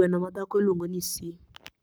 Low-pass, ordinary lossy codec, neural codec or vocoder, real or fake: none; none; codec, 44.1 kHz, 7.8 kbps, Pupu-Codec; fake